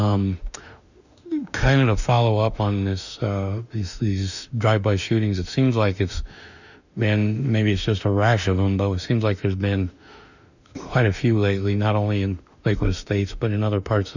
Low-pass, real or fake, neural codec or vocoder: 7.2 kHz; fake; autoencoder, 48 kHz, 32 numbers a frame, DAC-VAE, trained on Japanese speech